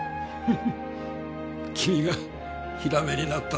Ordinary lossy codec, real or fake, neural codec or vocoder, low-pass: none; real; none; none